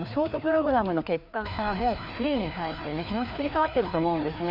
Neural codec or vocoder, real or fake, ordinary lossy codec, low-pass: codec, 16 kHz, 2 kbps, FreqCodec, larger model; fake; none; 5.4 kHz